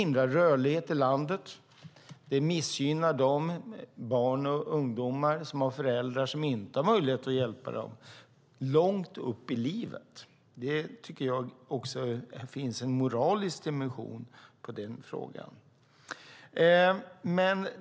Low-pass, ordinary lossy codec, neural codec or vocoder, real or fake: none; none; none; real